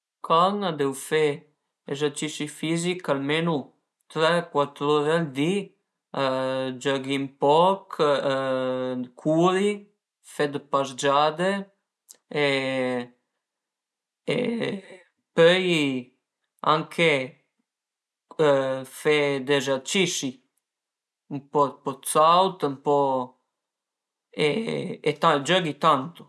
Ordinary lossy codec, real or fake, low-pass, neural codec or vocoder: none; real; none; none